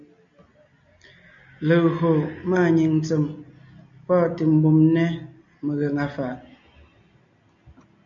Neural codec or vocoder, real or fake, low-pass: none; real; 7.2 kHz